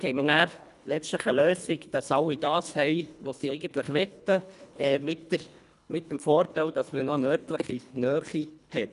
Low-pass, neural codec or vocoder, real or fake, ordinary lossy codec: 10.8 kHz; codec, 24 kHz, 1.5 kbps, HILCodec; fake; none